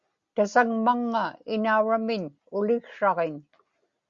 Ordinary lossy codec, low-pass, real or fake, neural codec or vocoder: Opus, 64 kbps; 7.2 kHz; real; none